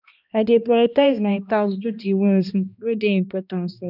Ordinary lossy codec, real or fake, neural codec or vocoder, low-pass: none; fake; codec, 16 kHz, 1 kbps, X-Codec, HuBERT features, trained on balanced general audio; 5.4 kHz